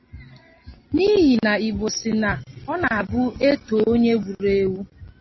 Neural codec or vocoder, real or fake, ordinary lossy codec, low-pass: none; real; MP3, 24 kbps; 7.2 kHz